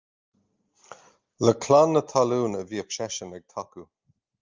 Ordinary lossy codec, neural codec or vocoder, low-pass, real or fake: Opus, 24 kbps; none; 7.2 kHz; real